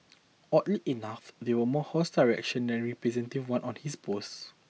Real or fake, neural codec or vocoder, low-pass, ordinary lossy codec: real; none; none; none